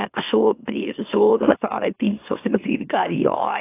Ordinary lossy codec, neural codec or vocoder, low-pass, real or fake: AAC, 24 kbps; autoencoder, 44.1 kHz, a latent of 192 numbers a frame, MeloTTS; 3.6 kHz; fake